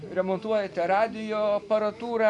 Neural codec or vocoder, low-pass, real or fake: none; 10.8 kHz; real